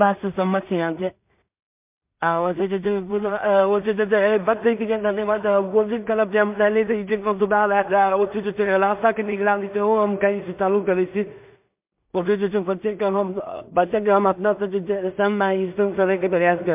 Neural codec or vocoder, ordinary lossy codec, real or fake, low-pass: codec, 16 kHz in and 24 kHz out, 0.4 kbps, LongCat-Audio-Codec, two codebook decoder; MP3, 32 kbps; fake; 3.6 kHz